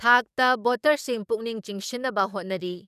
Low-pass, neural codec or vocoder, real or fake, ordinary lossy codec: 14.4 kHz; codec, 44.1 kHz, 7.8 kbps, DAC; fake; none